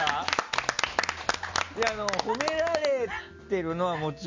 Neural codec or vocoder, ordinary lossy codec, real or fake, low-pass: none; MP3, 64 kbps; real; 7.2 kHz